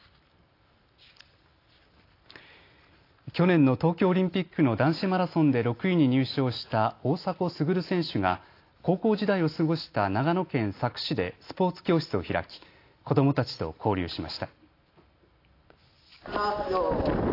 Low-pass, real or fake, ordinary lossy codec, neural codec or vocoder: 5.4 kHz; real; AAC, 32 kbps; none